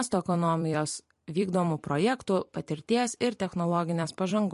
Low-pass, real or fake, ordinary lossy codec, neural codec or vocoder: 14.4 kHz; fake; MP3, 48 kbps; vocoder, 44.1 kHz, 128 mel bands every 256 samples, BigVGAN v2